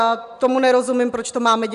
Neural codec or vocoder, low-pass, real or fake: none; 10.8 kHz; real